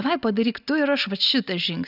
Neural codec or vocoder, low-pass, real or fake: none; 5.4 kHz; real